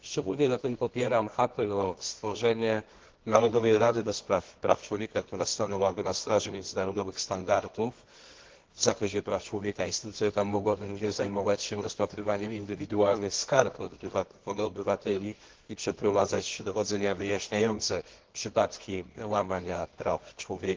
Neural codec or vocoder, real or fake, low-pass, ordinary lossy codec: codec, 24 kHz, 0.9 kbps, WavTokenizer, medium music audio release; fake; 7.2 kHz; Opus, 16 kbps